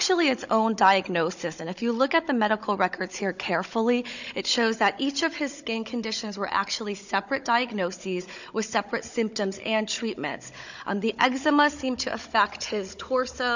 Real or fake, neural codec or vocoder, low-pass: fake; codec, 16 kHz, 16 kbps, FunCodec, trained on Chinese and English, 50 frames a second; 7.2 kHz